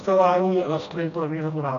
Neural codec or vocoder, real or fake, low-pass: codec, 16 kHz, 1 kbps, FreqCodec, smaller model; fake; 7.2 kHz